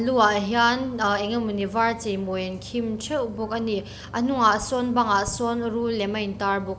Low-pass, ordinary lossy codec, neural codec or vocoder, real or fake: none; none; none; real